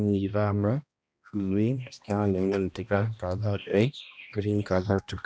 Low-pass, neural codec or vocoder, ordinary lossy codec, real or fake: none; codec, 16 kHz, 1 kbps, X-Codec, HuBERT features, trained on balanced general audio; none; fake